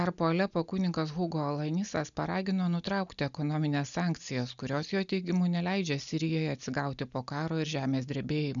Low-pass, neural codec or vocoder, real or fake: 7.2 kHz; none; real